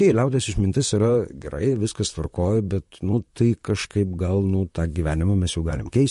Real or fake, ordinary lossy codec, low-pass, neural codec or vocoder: fake; MP3, 48 kbps; 14.4 kHz; vocoder, 44.1 kHz, 128 mel bands, Pupu-Vocoder